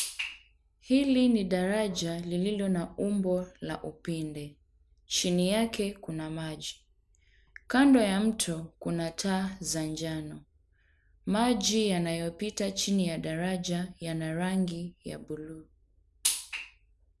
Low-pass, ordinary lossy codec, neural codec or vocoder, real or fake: none; none; none; real